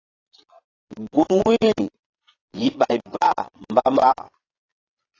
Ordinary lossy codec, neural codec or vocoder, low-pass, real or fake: AAC, 32 kbps; vocoder, 22.05 kHz, 80 mel bands, Vocos; 7.2 kHz; fake